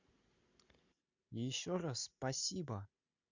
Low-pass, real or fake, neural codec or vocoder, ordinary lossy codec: 7.2 kHz; real; none; Opus, 64 kbps